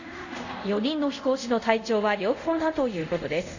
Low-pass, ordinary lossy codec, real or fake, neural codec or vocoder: 7.2 kHz; none; fake; codec, 24 kHz, 0.5 kbps, DualCodec